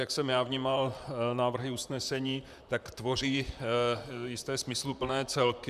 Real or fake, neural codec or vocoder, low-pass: fake; vocoder, 44.1 kHz, 128 mel bands, Pupu-Vocoder; 14.4 kHz